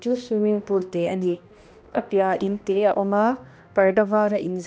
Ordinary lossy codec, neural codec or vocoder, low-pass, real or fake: none; codec, 16 kHz, 1 kbps, X-Codec, HuBERT features, trained on balanced general audio; none; fake